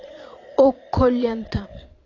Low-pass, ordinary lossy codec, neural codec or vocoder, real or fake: 7.2 kHz; AAC, 32 kbps; vocoder, 22.05 kHz, 80 mel bands, Vocos; fake